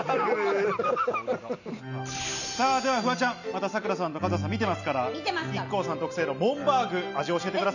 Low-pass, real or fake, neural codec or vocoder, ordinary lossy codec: 7.2 kHz; real; none; none